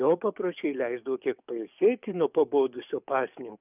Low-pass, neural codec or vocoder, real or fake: 3.6 kHz; codec, 44.1 kHz, 7.8 kbps, DAC; fake